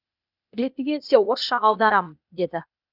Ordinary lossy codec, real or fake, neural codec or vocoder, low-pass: none; fake; codec, 16 kHz, 0.8 kbps, ZipCodec; 5.4 kHz